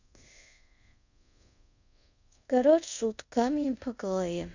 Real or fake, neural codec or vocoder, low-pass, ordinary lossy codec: fake; codec, 24 kHz, 0.5 kbps, DualCodec; 7.2 kHz; none